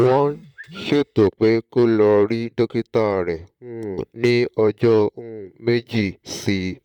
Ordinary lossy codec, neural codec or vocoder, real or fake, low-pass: none; vocoder, 44.1 kHz, 128 mel bands, Pupu-Vocoder; fake; 19.8 kHz